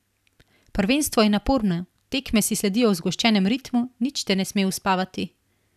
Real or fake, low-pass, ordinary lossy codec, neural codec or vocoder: real; 14.4 kHz; none; none